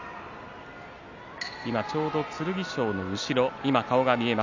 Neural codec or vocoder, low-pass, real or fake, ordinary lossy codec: none; 7.2 kHz; real; none